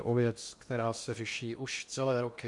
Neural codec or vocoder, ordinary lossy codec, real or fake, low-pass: codec, 16 kHz in and 24 kHz out, 0.8 kbps, FocalCodec, streaming, 65536 codes; MP3, 64 kbps; fake; 10.8 kHz